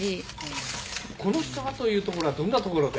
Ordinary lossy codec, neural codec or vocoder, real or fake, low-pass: none; none; real; none